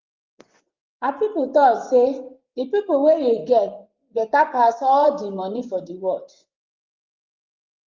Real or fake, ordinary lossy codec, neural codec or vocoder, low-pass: fake; Opus, 32 kbps; vocoder, 24 kHz, 100 mel bands, Vocos; 7.2 kHz